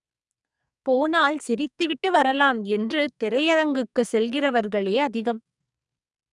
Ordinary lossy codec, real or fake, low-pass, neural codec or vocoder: none; fake; 10.8 kHz; codec, 44.1 kHz, 2.6 kbps, SNAC